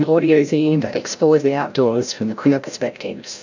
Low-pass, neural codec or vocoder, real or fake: 7.2 kHz; codec, 16 kHz, 0.5 kbps, FreqCodec, larger model; fake